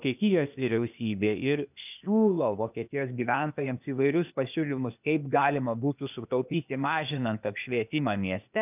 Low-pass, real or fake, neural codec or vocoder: 3.6 kHz; fake; codec, 16 kHz, 0.8 kbps, ZipCodec